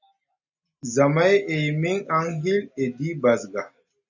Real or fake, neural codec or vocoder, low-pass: real; none; 7.2 kHz